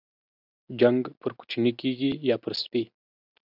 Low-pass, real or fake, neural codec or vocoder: 5.4 kHz; real; none